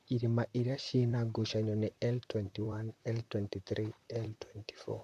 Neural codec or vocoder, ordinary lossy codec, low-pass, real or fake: vocoder, 44.1 kHz, 128 mel bands, Pupu-Vocoder; none; 14.4 kHz; fake